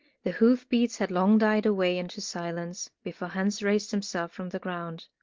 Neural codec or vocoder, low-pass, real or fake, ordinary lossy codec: none; 7.2 kHz; real; Opus, 16 kbps